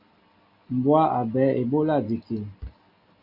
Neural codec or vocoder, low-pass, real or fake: none; 5.4 kHz; real